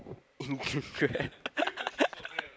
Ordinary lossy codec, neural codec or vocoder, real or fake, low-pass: none; none; real; none